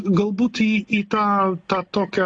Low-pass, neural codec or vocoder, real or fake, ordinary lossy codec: 9.9 kHz; none; real; AAC, 48 kbps